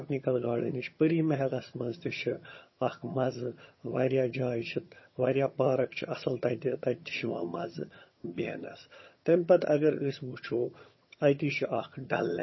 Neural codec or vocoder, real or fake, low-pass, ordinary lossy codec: vocoder, 22.05 kHz, 80 mel bands, HiFi-GAN; fake; 7.2 kHz; MP3, 24 kbps